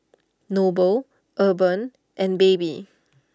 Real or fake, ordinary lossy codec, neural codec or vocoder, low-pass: real; none; none; none